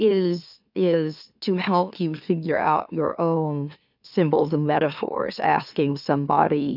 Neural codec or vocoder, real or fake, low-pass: autoencoder, 44.1 kHz, a latent of 192 numbers a frame, MeloTTS; fake; 5.4 kHz